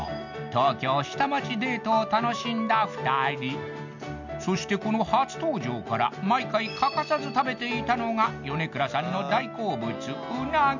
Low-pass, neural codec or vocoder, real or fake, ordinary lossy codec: 7.2 kHz; none; real; none